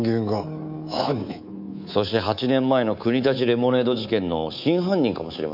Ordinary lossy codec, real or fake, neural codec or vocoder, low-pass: none; fake; codec, 24 kHz, 3.1 kbps, DualCodec; 5.4 kHz